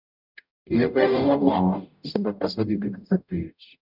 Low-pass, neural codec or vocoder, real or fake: 5.4 kHz; codec, 44.1 kHz, 0.9 kbps, DAC; fake